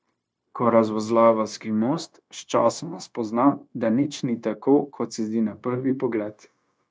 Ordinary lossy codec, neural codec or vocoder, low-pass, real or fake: none; codec, 16 kHz, 0.9 kbps, LongCat-Audio-Codec; none; fake